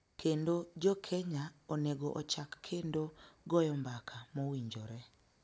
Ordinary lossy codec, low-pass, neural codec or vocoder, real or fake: none; none; none; real